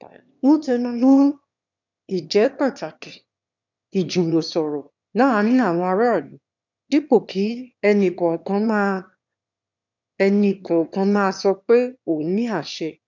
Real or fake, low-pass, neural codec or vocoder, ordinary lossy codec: fake; 7.2 kHz; autoencoder, 22.05 kHz, a latent of 192 numbers a frame, VITS, trained on one speaker; none